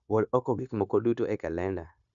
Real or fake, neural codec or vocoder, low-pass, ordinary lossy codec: fake; codec, 16 kHz, 0.9 kbps, LongCat-Audio-Codec; 7.2 kHz; none